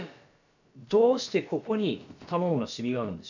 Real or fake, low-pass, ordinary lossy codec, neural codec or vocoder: fake; 7.2 kHz; none; codec, 16 kHz, about 1 kbps, DyCAST, with the encoder's durations